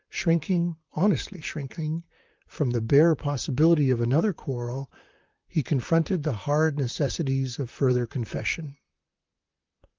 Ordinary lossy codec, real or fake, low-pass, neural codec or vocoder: Opus, 24 kbps; fake; 7.2 kHz; vocoder, 44.1 kHz, 128 mel bands, Pupu-Vocoder